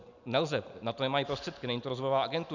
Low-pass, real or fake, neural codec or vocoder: 7.2 kHz; real; none